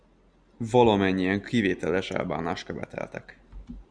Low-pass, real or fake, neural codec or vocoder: 9.9 kHz; real; none